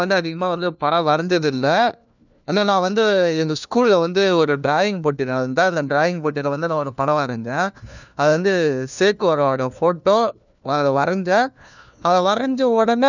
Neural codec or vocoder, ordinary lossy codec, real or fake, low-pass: codec, 16 kHz, 1 kbps, FunCodec, trained on LibriTTS, 50 frames a second; none; fake; 7.2 kHz